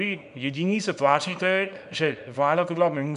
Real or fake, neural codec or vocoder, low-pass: fake; codec, 24 kHz, 0.9 kbps, WavTokenizer, small release; 10.8 kHz